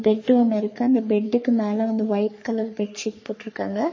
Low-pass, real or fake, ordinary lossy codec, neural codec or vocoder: 7.2 kHz; fake; MP3, 32 kbps; codec, 44.1 kHz, 3.4 kbps, Pupu-Codec